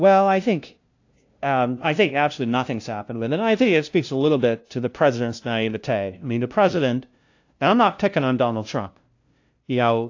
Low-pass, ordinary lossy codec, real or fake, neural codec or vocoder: 7.2 kHz; AAC, 48 kbps; fake; codec, 16 kHz, 0.5 kbps, FunCodec, trained on LibriTTS, 25 frames a second